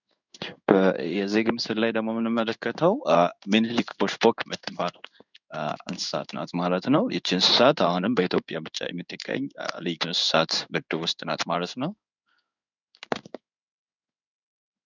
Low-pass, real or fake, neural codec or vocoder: 7.2 kHz; fake; codec, 16 kHz in and 24 kHz out, 1 kbps, XY-Tokenizer